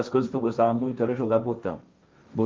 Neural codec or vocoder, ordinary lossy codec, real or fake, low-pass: codec, 16 kHz, 1.1 kbps, Voila-Tokenizer; Opus, 32 kbps; fake; 7.2 kHz